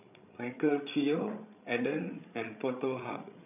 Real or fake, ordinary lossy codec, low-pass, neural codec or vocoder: fake; none; 3.6 kHz; codec, 16 kHz, 16 kbps, FreqCodec, larger model